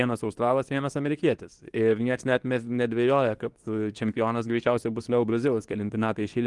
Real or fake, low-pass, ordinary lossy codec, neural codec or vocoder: fake; 10.8 kHz; Opus, 24 kbps; codec, 24 kHz, 0.9 kbps, WavTokenizer, medium speech release version 2